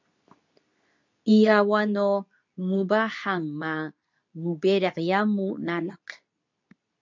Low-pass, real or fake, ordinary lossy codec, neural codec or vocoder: 7.2 kHz; fake; MP3, 64 kbps; codec, 24 kHz, 0.9 kbps, WavTokenizer, medium speech release version 2